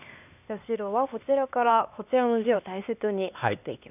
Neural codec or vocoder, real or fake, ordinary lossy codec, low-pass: codec, 16 kHz, 2 kbps, X-Codec, HuBERT features, trained on LibriSpeech; fake; none; 3.6 kHz